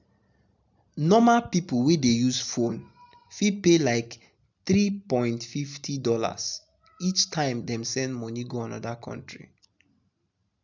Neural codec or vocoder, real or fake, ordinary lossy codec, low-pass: none; real; none; 7.2 kHz